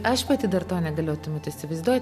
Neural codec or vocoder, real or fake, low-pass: none; real; 14.4 kHz